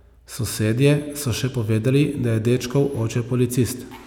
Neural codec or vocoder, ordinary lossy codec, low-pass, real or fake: none; none; 19.8 kHz; real